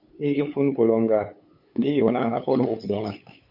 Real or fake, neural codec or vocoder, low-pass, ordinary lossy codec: fake; codec, 16 kHz, 8 kbps, FunCodec, trained on LibriTTS, 25 frames a second; 5.4 kHz; MP3, 48 kbps